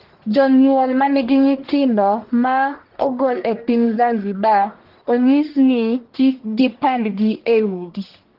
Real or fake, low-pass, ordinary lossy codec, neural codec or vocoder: fake; 5.4 kHz; Opus, 16 kbps; codec, 44.1 kHz, 1.7 kbps, Pupu-Codec